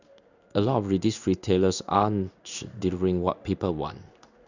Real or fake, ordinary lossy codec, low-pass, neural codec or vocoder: fake; none; 7.2 kHz; codec, 16 kHz in and 24 kHz out, 1 kbps, XY-Tokenizer